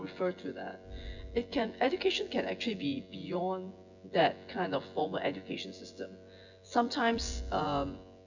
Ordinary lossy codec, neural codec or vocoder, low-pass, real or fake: none; vocoder, 24 kHz, 100 mel bands, Vocos; 7.2 kHz; fake